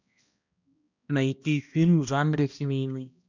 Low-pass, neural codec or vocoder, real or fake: 7.2 kHz; codec, 16 kHz, 1 kbps, X-Codec, HuBERT features, trained on balanced general audio; fake